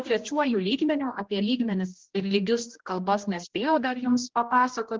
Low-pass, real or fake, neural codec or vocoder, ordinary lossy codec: 7.2 kHz; fake; codec, 16 kHz, 1 kbps, X-Codec, HuBERT features, trained on general audio; Opus, 16 kbps